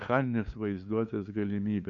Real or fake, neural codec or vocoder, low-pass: fake; codec, 16 kHz, 2 kbps, FunCodec, trained on LibriTTS, 25 frames a second; 7.2 kHz